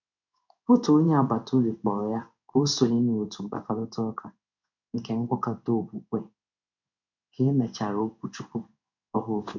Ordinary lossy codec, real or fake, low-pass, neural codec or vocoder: none; fake; 7.2 kHz; codec, 16 kHz in and 24 kHz out, 1 kbps, XY-Tokenizer